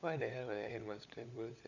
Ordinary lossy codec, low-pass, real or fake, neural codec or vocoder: none; 7.2 kHz; fake; codec, 16 kHz, 16 kbps, FunCodec, trained on LibriTTS, 50 frames a second